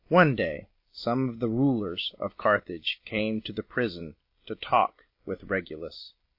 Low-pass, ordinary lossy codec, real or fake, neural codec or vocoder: 5.4 kHz; MP3, 32 kbps; real; none